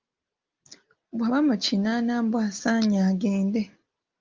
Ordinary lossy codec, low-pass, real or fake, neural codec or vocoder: Opus, 24 kbps; 7.2 kHz; real; none